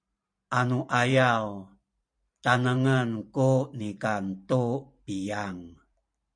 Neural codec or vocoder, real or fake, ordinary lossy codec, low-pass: vocoder, 44.1 kHz, 128 mel bands every 512 samples, BigVGAN v2; fake; MP3, 64 kbps; 9.9 kHz